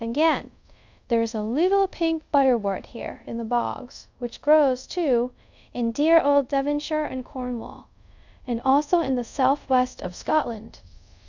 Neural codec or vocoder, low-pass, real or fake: codec, 24 kHz, 0.5 kbps, DualCodec; 7.2 kHz; fake